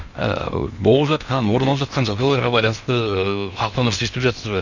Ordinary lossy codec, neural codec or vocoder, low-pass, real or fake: none; codec, 16 kHz in and 24 kHz out, 0.8 kbps, FocalCodec, streaming, 65536 codes; 7.2 kHz; fake